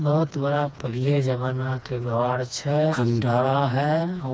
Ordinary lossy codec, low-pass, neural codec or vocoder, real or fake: none; none; codec, 16 kHz, 2 kbps, FreqCodec, smaller model; fake